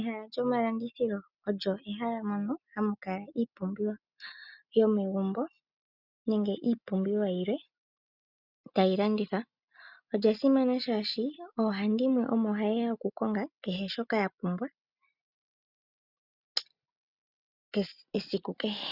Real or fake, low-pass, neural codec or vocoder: real; 5.4 kHz; none